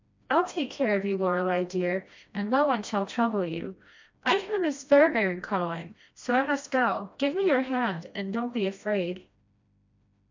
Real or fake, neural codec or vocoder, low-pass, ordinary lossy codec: fake; codec, 16 kHz, 1 kbps, FreqCodec, smaller model; 7.2 kHz; MP3, 64 kbps